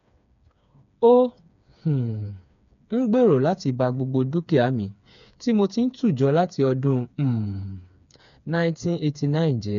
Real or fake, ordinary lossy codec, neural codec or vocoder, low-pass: fake; none; codec, 16 kHz, 4 kbps, FreqCodec, smaller model; 7.2 kHz